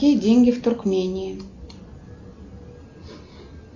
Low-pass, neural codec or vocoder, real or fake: 7.2 kHz; none; real